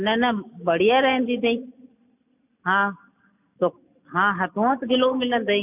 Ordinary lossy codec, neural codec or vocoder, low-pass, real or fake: none; none; 3.6 kHz; real